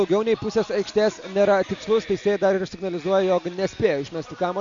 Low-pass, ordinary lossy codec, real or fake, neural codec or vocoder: 7.2 kHz; MP3, 64 kbps; real; none